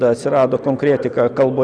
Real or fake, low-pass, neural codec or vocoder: real; 9.9 kHz; none